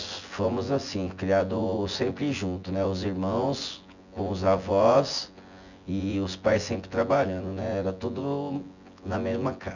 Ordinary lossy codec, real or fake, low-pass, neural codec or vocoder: none; fake; 7.2 kHz; vocoder, 24 kHz, 100 mel bands, Vocos